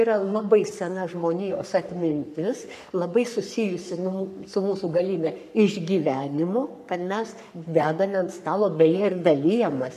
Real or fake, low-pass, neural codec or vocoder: fake; 14.4 kHz; codec, 44.1 kHz, 3.4 kbps, Pupu-Codec